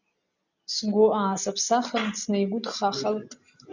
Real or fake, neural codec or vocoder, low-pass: real; none; 7.2 kHz